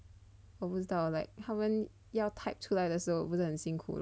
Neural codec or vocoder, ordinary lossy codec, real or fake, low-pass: none; none; real; none